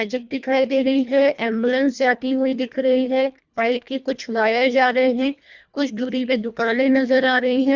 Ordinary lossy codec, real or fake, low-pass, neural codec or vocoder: Opus, 64 kbps; fake; 7.2 kHz; codec, 24 kHz, 1.5 kbps, HILCodec